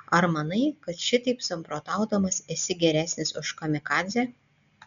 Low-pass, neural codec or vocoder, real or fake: 7.2 kHz; none; real